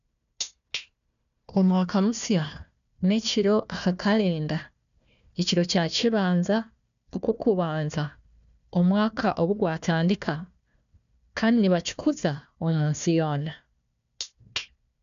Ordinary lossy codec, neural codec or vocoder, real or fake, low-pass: none; codec, 16 kHz, 1 kbps, FunCodec, trained on Chinese and English, 50 frames a second; fake; 7.2 kHz